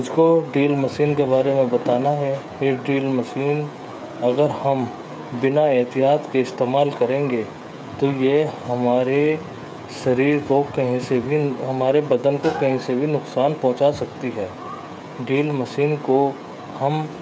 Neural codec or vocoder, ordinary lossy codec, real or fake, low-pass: codec, 16 kHz, 16 kbps, FreqCodec, smaller model; none; fake; none